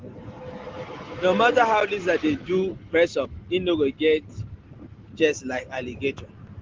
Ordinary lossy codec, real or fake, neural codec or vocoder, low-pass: Opus, 16 kbps; real; none; 7.2 kHz